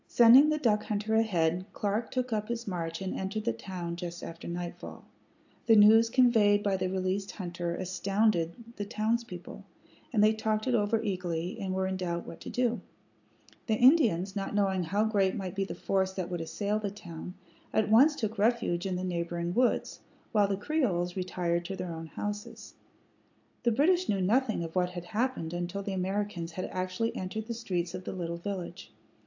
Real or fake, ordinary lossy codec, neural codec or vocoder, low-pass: real; AAC, 48 kbps; none; 7.2 kHz